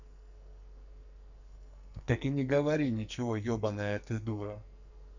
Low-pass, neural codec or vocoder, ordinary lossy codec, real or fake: 7.2 kHz; codec, 44.1 kHz, 2.6 kbps, SNAC; none; fake